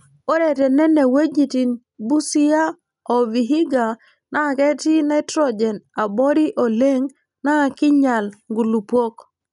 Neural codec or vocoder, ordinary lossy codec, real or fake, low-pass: none; none; real; 10.8 kHz